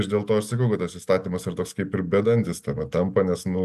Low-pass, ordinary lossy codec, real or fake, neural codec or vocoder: 14.4 kHz; AAC, 96 kbps; real; none